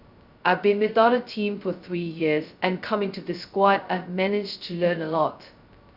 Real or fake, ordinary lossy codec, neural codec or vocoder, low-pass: fake; Opus, 64 kbps; codec, 16 kHz, 0.2 kbps, FocalCodec; 5.4 kHz